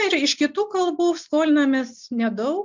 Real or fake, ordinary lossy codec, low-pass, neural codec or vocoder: real; MP3, 64 kbps; 7.2 kHz; none